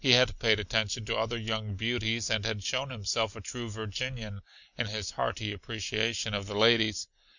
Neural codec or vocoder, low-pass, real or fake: none; 7.2 kHz; real